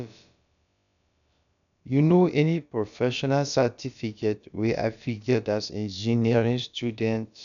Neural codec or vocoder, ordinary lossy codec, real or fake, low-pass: codec, 16 kHz, about 1 kbps, DyCAST, with the encoder's durations; none; fake; 7.2 kHz